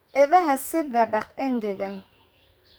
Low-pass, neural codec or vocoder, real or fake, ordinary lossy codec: none; codec, 44.1 kHz, 2.6 kbps, SNAC; fake; none